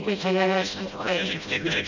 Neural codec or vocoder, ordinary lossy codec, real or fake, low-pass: codec, 16 kHz, 0.5 kbps, FreqCodec, smaller model; none; fake; 7.2 kHz